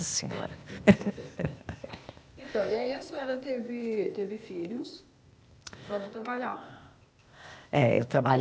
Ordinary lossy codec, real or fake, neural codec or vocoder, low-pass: none; fake; codec, 16 kHz, 0.8 kbps, ZipCodec; none